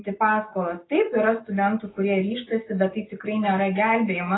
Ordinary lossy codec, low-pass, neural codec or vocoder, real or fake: AAC, 16 kbps; 7.2 kHz; none; real